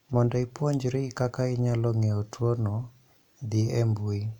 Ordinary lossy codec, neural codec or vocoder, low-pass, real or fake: none; none; 19.8 kHz; real